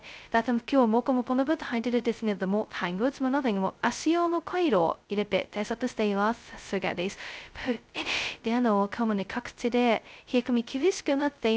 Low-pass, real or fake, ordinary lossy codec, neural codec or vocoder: none; fake; none; codec, 16 kHz, 0.2 kbps, FocalCodec